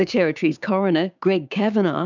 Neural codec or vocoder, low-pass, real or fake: codec, 16 kHz, 6 kbps, DAC; 7.2 kHz; fake